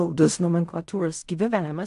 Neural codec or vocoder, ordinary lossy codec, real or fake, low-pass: codec, 16 kHz in and 24 kHz out, 0.4 kbps, LongCat-Audio-Codec, fine tuned four codebook decoder; AAC, 64 kbps; fake; 10.8 kHz